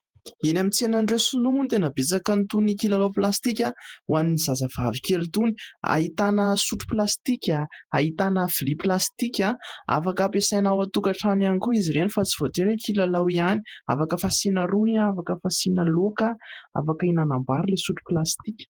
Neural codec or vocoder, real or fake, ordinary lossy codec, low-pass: vocoder, 48 kHz, 128 mel bands, Vocos; fake; Opus, 24 kbps; 19.8 kHz